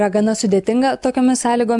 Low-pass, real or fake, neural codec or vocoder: 10.8 kHz; real; none